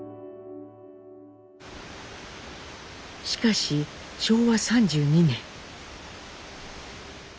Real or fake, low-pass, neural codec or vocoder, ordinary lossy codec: real; none; none; none